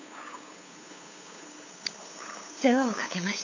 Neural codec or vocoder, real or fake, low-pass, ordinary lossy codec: codec, 16 kHz, 8 kbps, FunCodec, trained on LibriTTS, 25 frames a second; fake; 7.2 kHz; AAC, 48 kbps